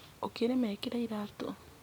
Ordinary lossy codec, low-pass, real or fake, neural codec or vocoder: none; none; real; none